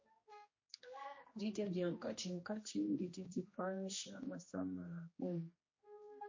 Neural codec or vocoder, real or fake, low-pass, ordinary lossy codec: codec, 16 kHz, 1 kbps, X-Codec, HuBERT features, trained on general audio; fake; 7.2 kHz; MP3, 32 kbps